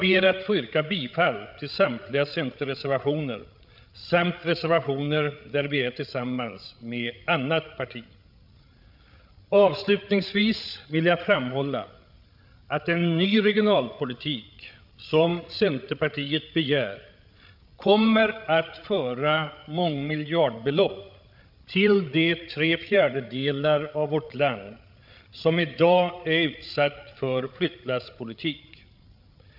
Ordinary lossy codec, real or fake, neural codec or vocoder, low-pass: none; fake; codec, 16 kHz, 8 kbps, FreqCodec, larger model; 5.4 kHz